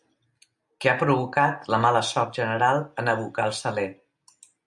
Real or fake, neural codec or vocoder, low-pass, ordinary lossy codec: real; none; 10.8 kHz; MP3, 64 kbps